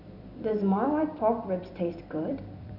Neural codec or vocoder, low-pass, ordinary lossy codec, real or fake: vocoder, 44.1 kHz, 128 mel bands every 256 samples, BigVGAN v2; 5.4 kHz; none; fake